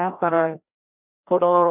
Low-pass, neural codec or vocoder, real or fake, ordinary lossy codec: 3.6 kHz; codec, 16 kHz, 1 kbps, FreqCodec, larger model; fake; none